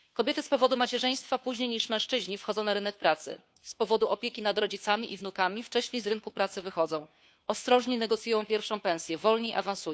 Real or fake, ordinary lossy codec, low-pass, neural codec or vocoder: fake; none; none; codec, 16 kHz, 2 kbps, FunCodec, trained on Chinese and English, 25 frames a second